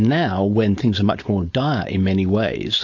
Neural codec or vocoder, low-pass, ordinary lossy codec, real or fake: codec, 16 kHz, 4.8 kbps, FACodec; 7.2 kHz; AAC, 48 kbps; fake